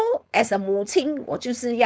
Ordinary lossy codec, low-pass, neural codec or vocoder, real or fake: none; none; codec, 16 kHz, 4.8 kbps, FACodec; fake